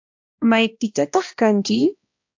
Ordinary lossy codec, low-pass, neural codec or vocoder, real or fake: AAC, 48 kbps; 7.2 kHz; codec, 16 kHz, 1 kbps, X-Codec, HuBERT features, trained on balanced general audio; fake